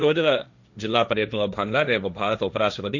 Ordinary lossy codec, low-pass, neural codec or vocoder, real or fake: none; 7.2 kHz; codec, 16 kHz, 1.1 kbps, Voila-Tokenizer; fake